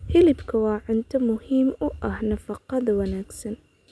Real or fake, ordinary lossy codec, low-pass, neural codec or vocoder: real; none; none; none